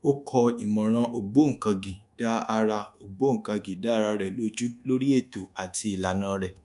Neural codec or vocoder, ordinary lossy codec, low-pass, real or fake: codec, 24 kHz, 1.2 kbps, DualCodec; none; 10.8 kHz; fake